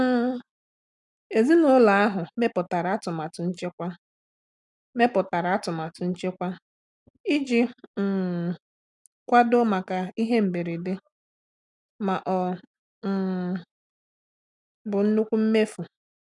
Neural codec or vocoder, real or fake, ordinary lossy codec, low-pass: none; real; none; 10.8 kHz